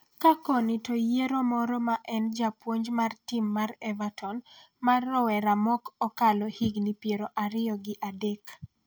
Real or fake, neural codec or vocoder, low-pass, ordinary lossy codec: real; none; none; none